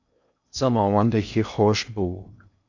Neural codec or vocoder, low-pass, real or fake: codec, 16 kHz in and 24 kHz out, 0.6 kbps, FocalCodec, streaming, 2048 codes; 7.2 kHz; fake